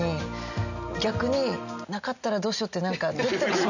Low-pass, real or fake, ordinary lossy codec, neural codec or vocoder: 7.2 kHz; real; none; none